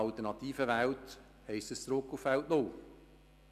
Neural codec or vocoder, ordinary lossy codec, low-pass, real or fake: none; none; 14.4 kHz; real